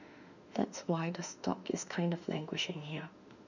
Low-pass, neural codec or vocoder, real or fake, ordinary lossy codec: 7.2 kHz; autoencoder, 48 kHz, 32 numbers a frame, DAC-VAE, trained on Japanese speech; fake; none